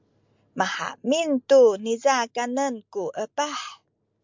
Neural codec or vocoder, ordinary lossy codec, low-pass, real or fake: none; MP3, 64 kbps; 7.2 kHz; real